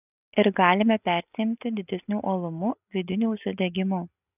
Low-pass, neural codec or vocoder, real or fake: 3.6 kHz; none; real